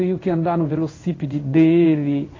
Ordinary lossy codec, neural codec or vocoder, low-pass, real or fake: AAC, 32 kbps; codec, 16 kHz in and 24 kHz out, 1 kbps, XY-Tokenizer; 7.2 kHz; fake